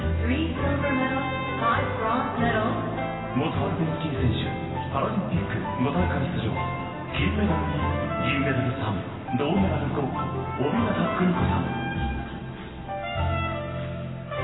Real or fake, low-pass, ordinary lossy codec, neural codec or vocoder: real; 7.2 kHz; AAC, 16 kbps; none